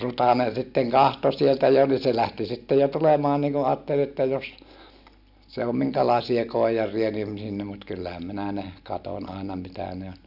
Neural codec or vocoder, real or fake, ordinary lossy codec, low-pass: none; real; none; 5.4 kHz